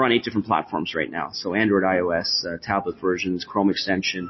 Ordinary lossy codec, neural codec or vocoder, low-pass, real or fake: MP3, 24 kbps; none; 7.2 kHz; real